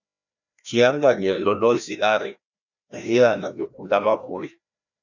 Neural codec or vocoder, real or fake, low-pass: codec, 16 kHz, 1 kbps, FreqCodec, larger model; fake; 7.2 kHz